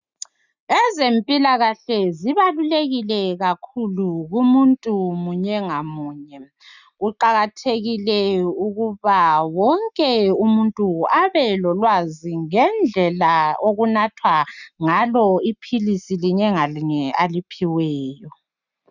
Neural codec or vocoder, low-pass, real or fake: none; 7.2 kHz; real